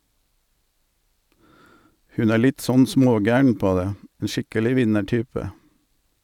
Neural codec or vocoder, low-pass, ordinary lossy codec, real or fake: none; 19.8 kHz; none; real